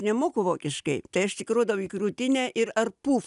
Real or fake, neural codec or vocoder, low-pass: real; none; 10.8 kHz